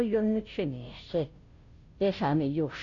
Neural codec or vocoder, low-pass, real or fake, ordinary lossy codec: codec, 16 kHz, 0.5 kbps, FunCodec, trained on Chinese and English, 25 frames a second; 7.2 kHz; fake; AAC, 48 kbps